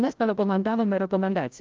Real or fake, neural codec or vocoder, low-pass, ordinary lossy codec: fake; codec, 16 kHz, 0.5 kbps, FreqCodec, larger model; 7.2 kHz; Opus, 24 kbps